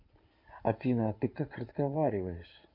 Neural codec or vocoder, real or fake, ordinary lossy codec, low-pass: codec, 16 kHz in and 24 kHz out, 2.2 kbps, FireRedTTS-2 codec; fake; MP3, 48 kbps; 5.4 kHz